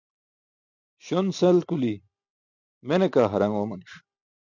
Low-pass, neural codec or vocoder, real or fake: 7.2 kHz; none; real